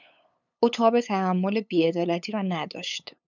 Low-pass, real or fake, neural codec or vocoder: 7.2 kHz; fake; codec, 16 kHz, 8 kbps, FunCodec, trained on LibriTTS, 25 frames a second